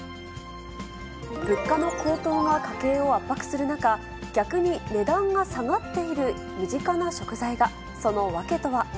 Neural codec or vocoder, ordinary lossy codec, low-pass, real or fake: none; none; none; real